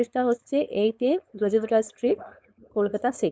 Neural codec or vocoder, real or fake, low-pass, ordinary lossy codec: codec, 16 kHz, 4.8 kbps, FACodec; fake; none; none